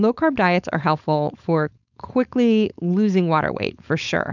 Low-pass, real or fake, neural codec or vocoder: 7.2 kHz; fake; codec, 16 kHz, 4.8 kbps, FACodec